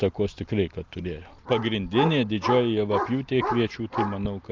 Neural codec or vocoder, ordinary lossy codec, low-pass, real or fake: none; Opus, 32 kbps; 7.2 kHz; real